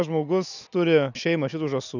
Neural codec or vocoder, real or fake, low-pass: none; real; 7.2 kHz